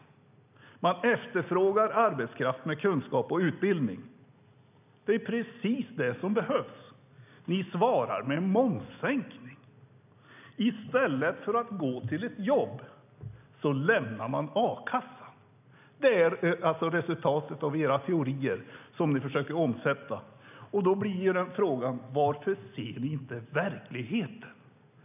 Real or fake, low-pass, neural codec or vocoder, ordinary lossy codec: real; 3.6 kHz; none; none